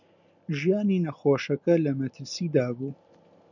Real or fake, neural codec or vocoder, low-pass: real; none; 7.2 kHz